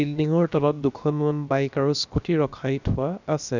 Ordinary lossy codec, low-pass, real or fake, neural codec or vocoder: none; 7.2 kHz; fake; codec, 16 kHz, about 1 kbps, DyCAST, with the encoder's durations